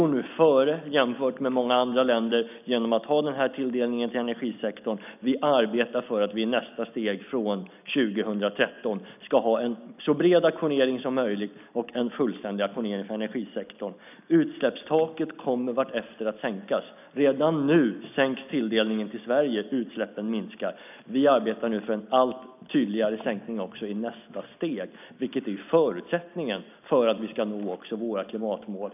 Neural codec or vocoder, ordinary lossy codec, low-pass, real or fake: none; none; 3.6 kHz; real